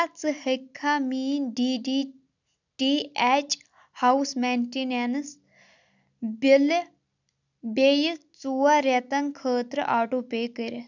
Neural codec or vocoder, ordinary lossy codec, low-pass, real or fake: none; none; 7.2 kHz; real